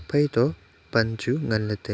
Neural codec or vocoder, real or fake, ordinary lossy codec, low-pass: none; real; none; none